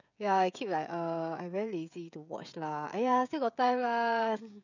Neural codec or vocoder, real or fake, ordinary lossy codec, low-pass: codec, 16 kHz, 16 kbps, FreqCodec, smaller model; fake; none; 7.2 kHz